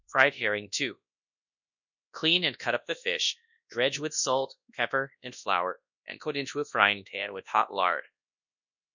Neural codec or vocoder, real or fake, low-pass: codec, 24 kHz, 0.9 kbps, WavTokenizer, large speech release; fake; 7.2 kHz